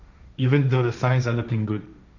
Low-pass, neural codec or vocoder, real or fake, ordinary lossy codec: 7.2 kHz; codec, 16 kHz, 1.1 kbps, Voila-Tokenizer; fake; none